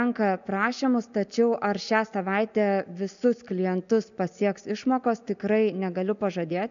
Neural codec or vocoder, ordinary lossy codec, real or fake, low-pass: none; AAC, 96 kbps; real; 7.2 kHz